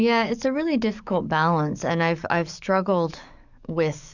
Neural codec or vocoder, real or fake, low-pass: codec, 44.1 kHz, 7.8 kbps, DAC; fake; 7.2 kHz